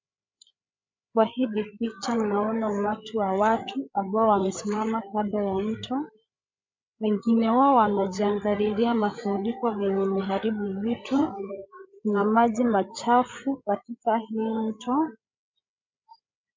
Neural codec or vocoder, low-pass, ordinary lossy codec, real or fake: codec, 16 kHz, 8 kbps, FreqCodec, larger model; 7.2 kHz; MP3, 64 kbps; fake